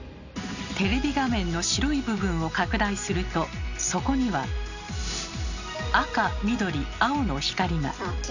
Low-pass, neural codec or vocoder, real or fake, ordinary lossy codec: 7.2 kHz; none; real; none